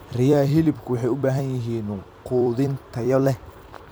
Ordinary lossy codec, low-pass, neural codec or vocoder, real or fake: none; none; vocoder, 44.1 kHz, 128 mel bands every 256 samples, BigVGAN v2; fake